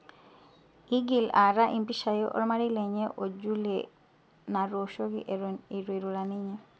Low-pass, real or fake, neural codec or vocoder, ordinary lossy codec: none; real; none; none